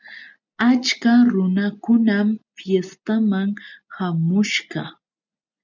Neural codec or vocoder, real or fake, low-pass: none; real; 7.2 kHz